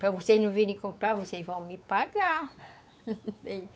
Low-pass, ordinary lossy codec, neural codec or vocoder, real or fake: none; none; codec, 16 kHz, 4 kbps, X-Codec, WavLM features, trained on Multilingual LibriSpeech; fake